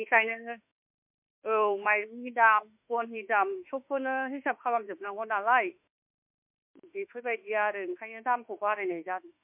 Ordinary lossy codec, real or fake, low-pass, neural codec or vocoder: MP3, 32 kbps; fake; 3.6 kHz; autoencoder, 48 kHz, 32 numbers a frame, DAC-VAE, trained on Japanese speech